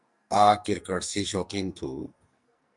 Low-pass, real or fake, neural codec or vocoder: 10.8 kHz; fake; codec, 44.1 kHz, 2.6 kbps, SNAC